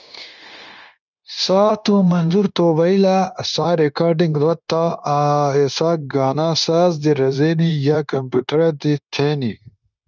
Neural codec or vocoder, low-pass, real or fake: codec, 16 kHz, 0.9 kbps, LongCat-Audio-Codec; 7.2 kHz; fake